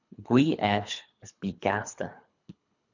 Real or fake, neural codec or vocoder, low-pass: fake; codec, 24 kHz, 3 kbps, HILCodec; 7.2 kHz